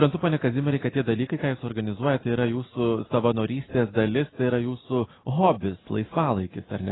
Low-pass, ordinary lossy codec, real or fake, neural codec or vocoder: 7.2 kHz; AAC, 16 kbps; real; none